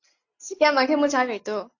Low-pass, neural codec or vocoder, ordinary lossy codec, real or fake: 7.2 kHz; none; AAC, 48 kbps; real